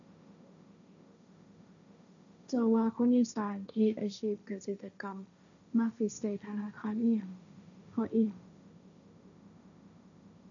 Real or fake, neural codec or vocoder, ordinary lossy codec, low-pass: fake; codec, 16 kHz, 1.1 kbps, Voila-Tokenizer; none; 7.2 kHz